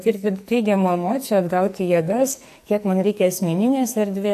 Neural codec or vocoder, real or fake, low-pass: codec, 44.1 kHz, 2.6 kbps, SNAC; fake; 14.4 kHz